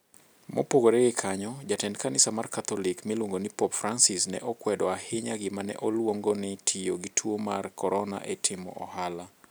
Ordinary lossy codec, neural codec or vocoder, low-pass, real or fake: none; none; none; real